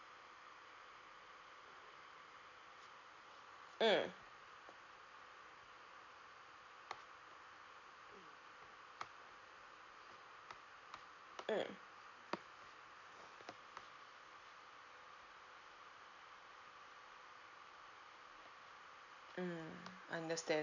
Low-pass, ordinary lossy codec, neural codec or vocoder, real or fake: 7.2 kHz; none; none; real